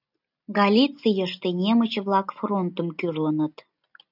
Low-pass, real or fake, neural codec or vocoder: 5.4 kHz; real; none